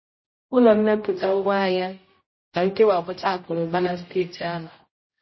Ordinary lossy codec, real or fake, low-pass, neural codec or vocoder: MP3, 24 kbps; fake; 7.2 kHz; codec, 16 kHz, 0.5 kbps, X-Codec, HuBERT features, trained on general audio